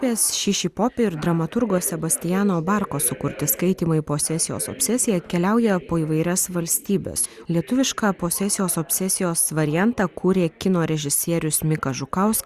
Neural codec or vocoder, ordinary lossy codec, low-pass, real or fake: none; Opus, 64 kbps; 14.4 kHz; real